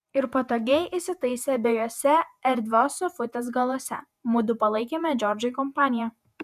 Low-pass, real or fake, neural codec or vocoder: 14.4 kHz; fake; vocoder, 48 kHz, 128 mel bands, Vocos